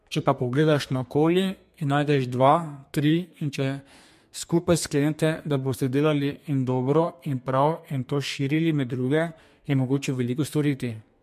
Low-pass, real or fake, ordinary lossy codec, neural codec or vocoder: 14.4 kHz; fake; MP3, 64 kbps; codec, 32 kHz, 1.9 kbps, SNAC